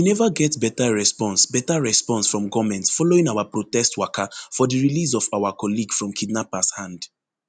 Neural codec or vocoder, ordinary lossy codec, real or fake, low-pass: none; none; real; 9.9 kHz